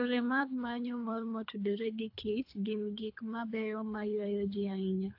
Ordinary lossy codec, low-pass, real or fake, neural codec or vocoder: AAC, 48 kbps; 5.4 kHz; fake; codec, 24 kHz, 3 kbps, HILCodec